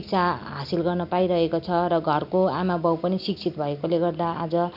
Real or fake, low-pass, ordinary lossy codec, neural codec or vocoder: real; 5.4 kHz; none; none